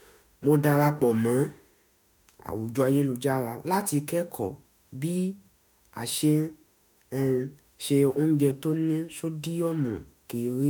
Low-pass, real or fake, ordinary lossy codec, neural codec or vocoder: none; fake; none; autoencoder, 48 kHz, 32 numbers a frame, DAC-VAE, trained on Japanese speech